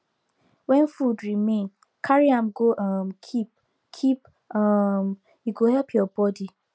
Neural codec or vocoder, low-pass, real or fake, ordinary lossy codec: none; none; real; none